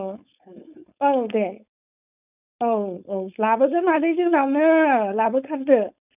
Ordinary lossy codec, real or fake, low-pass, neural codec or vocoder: none; fake; 3.6 kHz; codec, 16 kHz, 4.8 kbps, FACodec